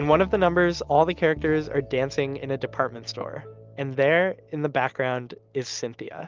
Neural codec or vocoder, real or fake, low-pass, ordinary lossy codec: none; real; 7.2 kHz; Opus, 24 kbps